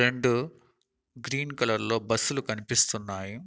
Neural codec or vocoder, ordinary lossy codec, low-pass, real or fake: none; none; none; real